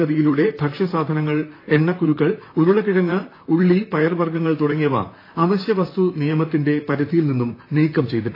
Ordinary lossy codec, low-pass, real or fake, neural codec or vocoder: AAC, 24 kbps; 5.4 kHz; fake; vocoder, 44.1 kHz, 128 mel bands, Pupu-Vocoder